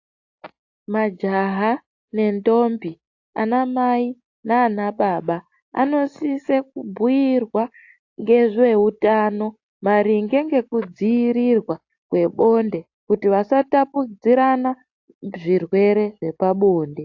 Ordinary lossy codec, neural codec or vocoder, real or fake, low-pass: AAC, 48 kbps; none; real; 7.2 kHz